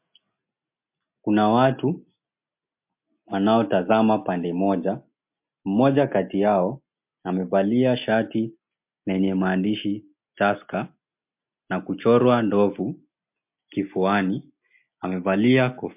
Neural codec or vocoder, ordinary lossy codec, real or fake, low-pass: none; MP3, 32 kbps; real; 3.6 kHz